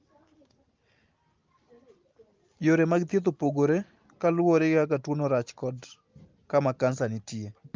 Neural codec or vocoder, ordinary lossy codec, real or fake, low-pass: none; Opus, 32 kbps; real; 7.2 kHz